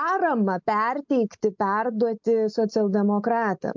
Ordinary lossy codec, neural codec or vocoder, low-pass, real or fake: MP3, 64 kbps; none; 7.2 kHz; real